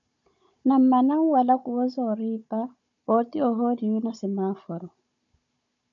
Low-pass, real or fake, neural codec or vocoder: 7.2 kHz; fake; codec, 16 kHz, 16 kbps, FunCodec, trained on Chinese and English, 50 frames a second